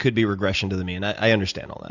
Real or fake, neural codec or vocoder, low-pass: real; none; 7.2 kHz